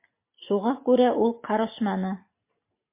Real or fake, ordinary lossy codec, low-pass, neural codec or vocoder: real; MP3, 24 kbps; 3.6 kHz; none